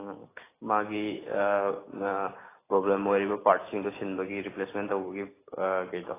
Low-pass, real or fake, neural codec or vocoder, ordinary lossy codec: 3.6 kHz; real; none; AAC, 16 kbps